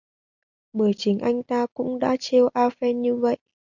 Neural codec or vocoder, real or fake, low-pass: none; real; 7.2 kHz